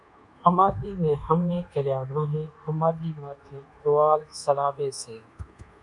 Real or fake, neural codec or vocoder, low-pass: fake; codec, 24 kHz, 1.2 kbps, DualCodec; 10.8 kHz